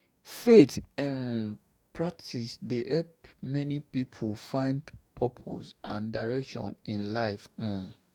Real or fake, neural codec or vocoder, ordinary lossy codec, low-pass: fake; codec, 44.1 kHz, 2.6 kbps, DAC; none; 19.8 kHz